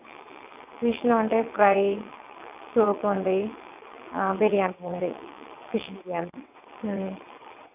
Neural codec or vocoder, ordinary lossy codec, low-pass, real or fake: vocoder, 22.05 kHz, 80 mel bands, WaveNeXt; none; 3.6 kHz; fake